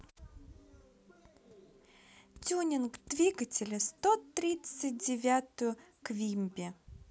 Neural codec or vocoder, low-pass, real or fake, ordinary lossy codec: none; none; real; none